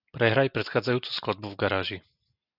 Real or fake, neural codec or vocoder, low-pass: real; none; 5.4 kHz